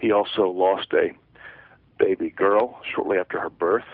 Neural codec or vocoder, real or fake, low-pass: none; real; 5.4 kHz